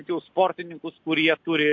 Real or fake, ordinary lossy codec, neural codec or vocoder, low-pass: real; MP3, 48 kbps; none; 7.2 kHz